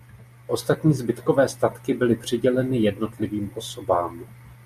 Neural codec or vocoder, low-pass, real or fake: none; 14.4 kHz; real